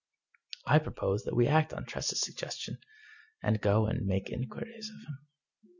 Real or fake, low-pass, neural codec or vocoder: real; 7.2 kHz; none